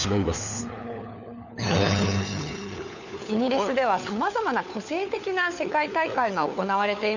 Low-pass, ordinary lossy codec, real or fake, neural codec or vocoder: 7.2 kHz; none; fake; codec, 16 kHz, 4 kbps, FunCodec, trained on LibriTTS, 50 frames a second